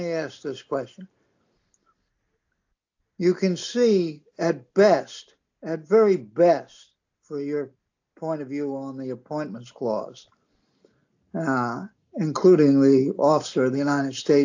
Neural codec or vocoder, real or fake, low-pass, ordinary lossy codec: none; real; 7.2 kHz; AAC, 48 kbps